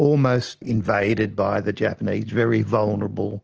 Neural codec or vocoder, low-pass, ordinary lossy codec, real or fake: none; 7.2 kHz; Opus, 16 kbps; real